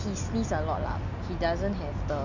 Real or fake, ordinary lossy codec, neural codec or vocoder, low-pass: real; none; none; 7.2 kHz